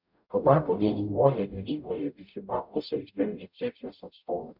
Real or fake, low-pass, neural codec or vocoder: fake; 5.4 kHz; codec, 44.1 kHz, 0.9 kbps, DAC